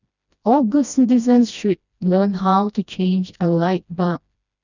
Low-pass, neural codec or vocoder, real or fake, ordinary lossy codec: 7.2 kHz; codec, 16 kHz, 1 kbps, FreqCodec, smaller model; fake; none